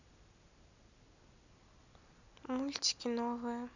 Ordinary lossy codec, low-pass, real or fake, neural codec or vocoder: none; 7.2 kHz; real; none